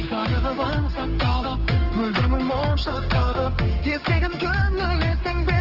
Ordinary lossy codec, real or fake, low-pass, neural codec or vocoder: Opus, 16 kbps; fake; 5.4 kHz; codec, 16 kHz, 4 kbps, X-Codec, HuBERT features, trained on general audio